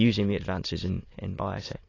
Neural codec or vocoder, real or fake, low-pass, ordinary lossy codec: autoencoder, 22.05 kHz, a latent of 192 numbers a frame, VITS, trained on many speakers; fake; 7.2 kHz; AAC, 32 kbps